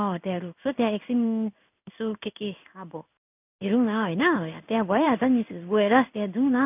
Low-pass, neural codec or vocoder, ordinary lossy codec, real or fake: 3.6 kHz; codec, 16 kHz in and 24 kHz out, 1 kbps, XY-Tokenizer; none; fake